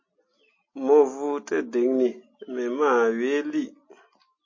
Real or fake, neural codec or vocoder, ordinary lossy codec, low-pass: real; none; MP3, 32 kbps; 7.2 kHz